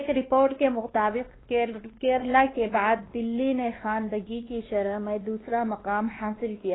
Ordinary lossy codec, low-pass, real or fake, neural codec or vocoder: AAC, 16 kbps; 7.2 kHz; fake; codec, 16 kHz, 2 kbps, X-Codec, WavLM features, trained on Multilingual LibriSpeech